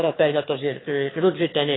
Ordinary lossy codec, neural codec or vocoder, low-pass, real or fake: AAC, 16 kbps; autoencoder, 22.05 kHz, a latent of 192 numbers a frame, VITS, trained on one speaker; 7.2 kHz; fake